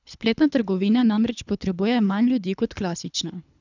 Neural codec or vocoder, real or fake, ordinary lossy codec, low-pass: codec, 24 kHz, 3 kbps, HILCodec; fake; none; 7.2 kHz